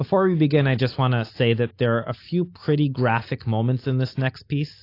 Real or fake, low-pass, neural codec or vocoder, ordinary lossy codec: real; 5.4 kHz; none; AAC, 32 kbps